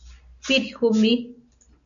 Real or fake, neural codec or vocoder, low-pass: real; none; 7.2 kHz